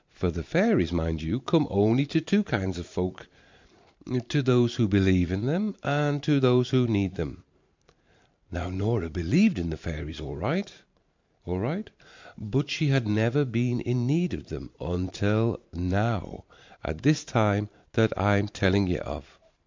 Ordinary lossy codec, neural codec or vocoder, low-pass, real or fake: MP3, 64 kbps; vocoder, 44.1 kHz, 128 mel bands every 512 samples, BigVGAN v2; 7.2 kHz; fake